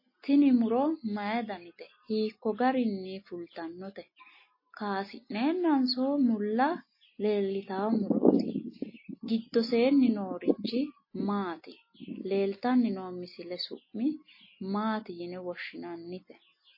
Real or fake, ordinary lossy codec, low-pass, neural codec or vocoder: real; MP3, 24 kbps; 5.4 kHz; none